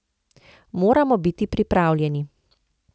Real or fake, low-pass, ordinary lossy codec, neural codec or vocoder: real; none; none; none